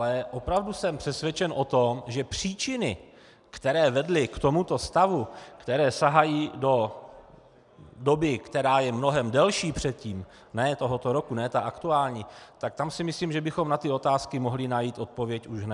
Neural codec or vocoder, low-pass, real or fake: none; 10.8 kHz; real